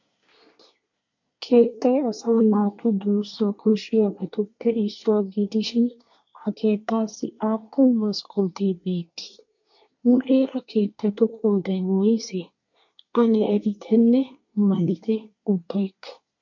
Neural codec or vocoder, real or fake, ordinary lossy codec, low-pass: codec, 24 kHz, 1 kbps, SNAC; fake; MP3, 48 kbps; 7.2 kHz